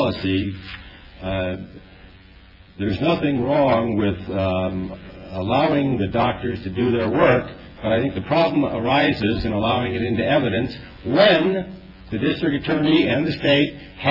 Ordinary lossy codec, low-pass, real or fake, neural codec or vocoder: AAC, 48 kbps; 5.4 kHz; fake; vocoder, 24 kHz, 100 mel bands, Vocos